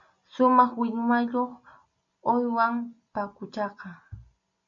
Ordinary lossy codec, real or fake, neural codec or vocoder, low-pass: MP3, 64 kbps; real; none; 7.2 kHz